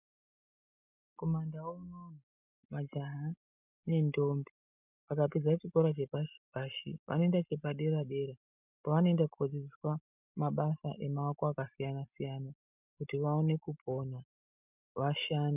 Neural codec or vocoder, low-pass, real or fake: none; 3.6 kHz; real